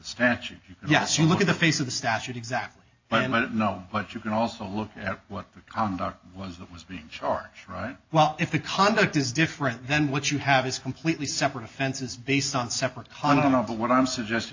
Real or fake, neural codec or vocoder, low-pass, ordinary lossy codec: real; none; 7.2 kHz; AAC, 48 kbps